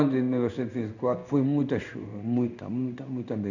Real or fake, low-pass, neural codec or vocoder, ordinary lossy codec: fake; 7.2 kHz; codec, 16 kHz in and 24 kHz out, 1 kbps, XY-Tokenizer; none